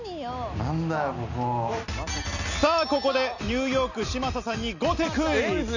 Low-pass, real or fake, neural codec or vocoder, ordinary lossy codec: 7.2 kHz; real; none; none